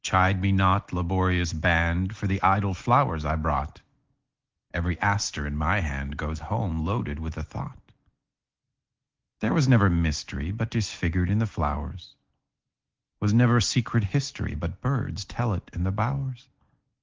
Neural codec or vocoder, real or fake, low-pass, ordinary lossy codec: none; real; 7.2 kHz; Opus, 16 kbps